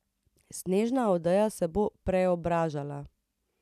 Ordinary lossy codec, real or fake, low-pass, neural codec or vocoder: none; real; 14.4 kHz; none